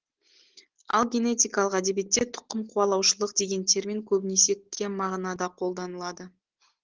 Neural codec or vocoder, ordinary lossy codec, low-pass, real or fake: none; Opus, 16 kbps; 7.2 kHz; real